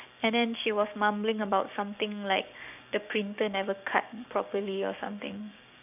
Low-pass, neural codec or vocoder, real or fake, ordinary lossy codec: 3.6 kHz; codec, 16 kHz, 6 kbps, DAC; fake; none